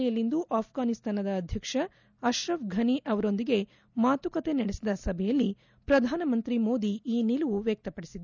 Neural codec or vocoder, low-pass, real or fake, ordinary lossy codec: none; 7.2 kHz; real; none